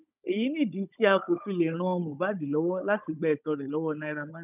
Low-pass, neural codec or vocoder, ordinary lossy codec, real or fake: 3.6 kHz; codec, 16 kHz, 16 kbps, FunCodec, trained on Chinese and English, 50 frames a second; none; fake